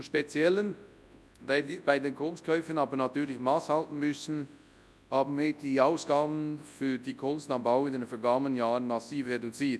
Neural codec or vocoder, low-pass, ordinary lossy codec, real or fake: codec, 24 kHz, 0.9 kbps, WavTokenizer, large speech release; none; none; fake